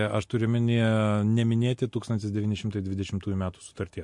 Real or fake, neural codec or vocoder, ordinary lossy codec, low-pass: real; none; MP3, 48 kbps; 10.8 kHz